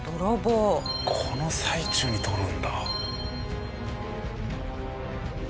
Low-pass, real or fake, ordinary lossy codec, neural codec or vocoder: none; real; none; none